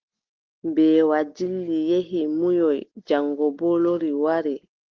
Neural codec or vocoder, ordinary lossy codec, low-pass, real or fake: none; Opus, 16 kbps; 7.2 kHz; real